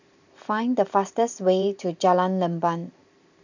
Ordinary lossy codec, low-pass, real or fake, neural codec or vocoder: none; 7.2 kHz; fake; vocoder, 44.1 kHz, 128 mel bands every 512 samples, BigVGAN v2